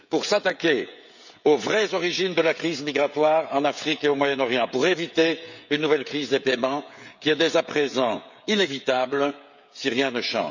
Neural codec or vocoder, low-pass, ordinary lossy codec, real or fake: codec, 16 kHz, 16 kbps, FreqCodec, smaller model; 7.2 kHz; none; fake